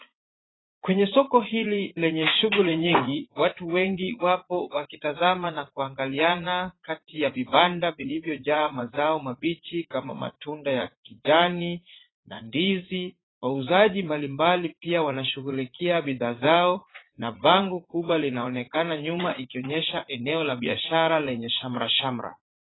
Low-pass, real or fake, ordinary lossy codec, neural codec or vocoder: 7.2 kHz; fake; AAC, 16 kbps; vocoder, 44.1 kHz, 80 mel bands, Vocos